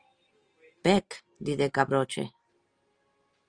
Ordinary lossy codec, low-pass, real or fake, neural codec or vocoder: Opus, 24 kbps; 9.9 kHz; real; none